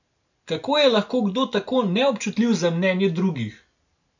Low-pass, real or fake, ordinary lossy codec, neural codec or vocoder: 7.2 kHz; real; none; none